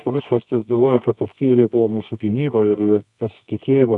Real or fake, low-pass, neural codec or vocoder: fake; 10.8 kHz; codec, 24 kHz, 0.9 kbps, WavTokenizer, medium music audio release